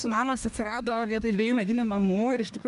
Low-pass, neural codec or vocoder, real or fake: 10.8 kHz; codec, 24 kHz, 1 kbps, SNAC; fake